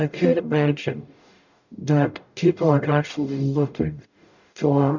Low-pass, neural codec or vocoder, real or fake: 7.2 kHz; codec, 44.1 kHz, 0.9 kbps, DAC; fake